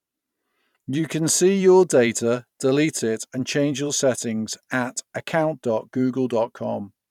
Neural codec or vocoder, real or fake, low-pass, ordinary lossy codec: vocoder, 44.1 kHz, 128 mel bands every 512 samples, BigVGAN v2; fake; 19.8 kHz; none